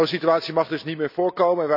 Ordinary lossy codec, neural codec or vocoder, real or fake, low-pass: none; none; real; 5.4 kHz